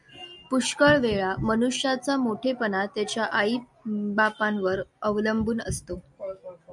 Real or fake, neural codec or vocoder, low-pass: real; none; 10.8 kHz